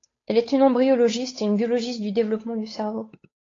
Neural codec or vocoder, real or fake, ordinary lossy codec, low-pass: codec, 16 kHz, 8 kbps, FunCodec, trained on Chinese and English, 25 frames a second; fake; AAC, 32 kbps; 7.2 kHz